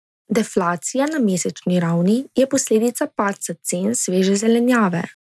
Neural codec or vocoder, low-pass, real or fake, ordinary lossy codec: none; none; real; none